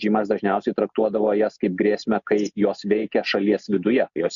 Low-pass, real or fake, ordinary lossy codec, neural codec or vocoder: 7.2 kHz; real; MP3, 64 kbps; none